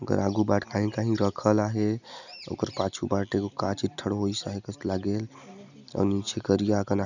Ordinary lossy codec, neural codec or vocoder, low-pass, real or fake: none; none; 7.2 kHz; real